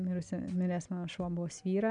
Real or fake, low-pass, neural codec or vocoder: real; 9.9 kHz; none